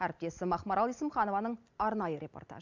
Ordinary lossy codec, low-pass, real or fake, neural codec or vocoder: none; 7.2 kHz; real; none